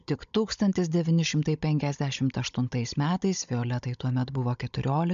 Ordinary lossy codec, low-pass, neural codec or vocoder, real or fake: MP3, 64 kbps; 7.2 kHz; codec, 16 kHz, 16 kbps, FunCodec, trained on Chinese and English, 50 frames a second; fake